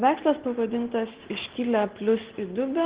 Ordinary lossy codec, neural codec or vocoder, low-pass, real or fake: Opus, 16 kbps; none; 3.6 kHz; real